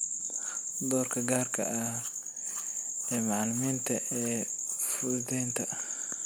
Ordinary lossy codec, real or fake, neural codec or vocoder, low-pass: none; real; none; none